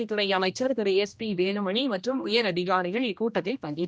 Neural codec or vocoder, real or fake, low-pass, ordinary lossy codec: codec, 16 kHz, 1 kbps, X-Codec, HuBERT features, trained on general audio; fake; none; none